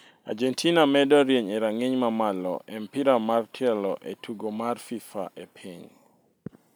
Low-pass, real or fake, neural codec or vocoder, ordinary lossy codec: none; real; none; none